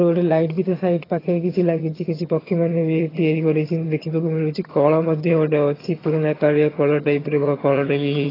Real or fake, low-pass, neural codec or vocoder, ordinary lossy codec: fake; 5.4 kHz; vocoder, 22.05 kHz, 80 mel bands, HiFi-GAN; AAC, 24 kbps